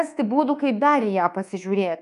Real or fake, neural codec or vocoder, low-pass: fake; codec, 24 kHz, 1.2 kbps, DualCodec; 10.8 kHz